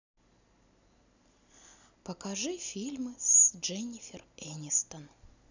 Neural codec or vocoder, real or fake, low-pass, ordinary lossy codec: none; real; 7.2 kHz; none